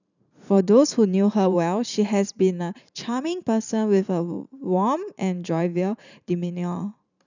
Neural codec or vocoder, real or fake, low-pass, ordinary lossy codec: vocoder, 44.1 kHz, 128 mel bands every 512 samples, BigVGAN v2; fake; 7.2 kHz; none